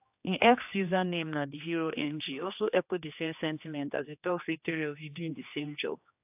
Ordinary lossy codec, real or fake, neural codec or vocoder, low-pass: AAC, 32 kbps; fake; codec, 16 kHz, 2 kbps, X-Codec, HuBERT features, trained on general audio; 3.6 kHz